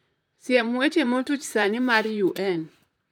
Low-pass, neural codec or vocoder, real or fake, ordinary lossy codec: 19.8 kHz; vocoder, 44.1 kHz, 128 mel bands, Pupu-Vocoder; fake; none